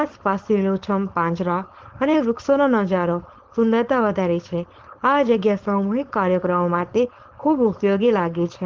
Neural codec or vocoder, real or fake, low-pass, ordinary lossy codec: codec, 16 kHz, 4.8 kbps, FACodec; fake; 7.2 kHz; Opus, 16 kbps